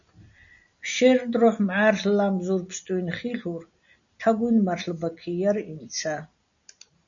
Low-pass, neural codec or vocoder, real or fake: 7.2 kHz; none; real